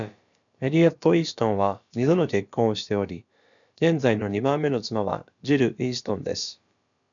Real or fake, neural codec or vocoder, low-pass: fake; codec, 16 kHz, about 1 kbps, DyCAST, with the encoder's durations; 7.2 kHz